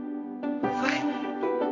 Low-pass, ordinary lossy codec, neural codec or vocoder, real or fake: 7.2 kHz; MP3, 48 kbps; codec, 24 kHz, 0.9 kbps, WavTokenizer, medium music audio release; fake